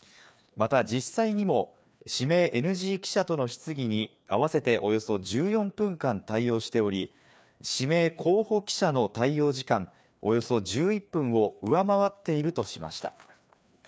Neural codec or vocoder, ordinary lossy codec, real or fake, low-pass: codec, 16 kHz, 2 kbps, FreqCodec, larger model; none; fake; none